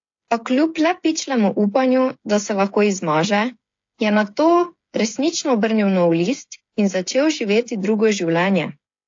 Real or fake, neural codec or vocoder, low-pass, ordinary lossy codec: fake; codec, 16 kHz, 8 kbps, FreqCodec, smaller model; 7.2 kHz; AAC, 48 kbps